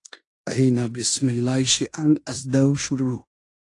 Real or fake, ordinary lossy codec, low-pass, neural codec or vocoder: fake; AAC, 48 kbps; 10.8 kHz; codec, 16 kHz in and 24 kHz out, 0.9 kbps, LongCat-Audio-Codec, fine tuned four codebook decoder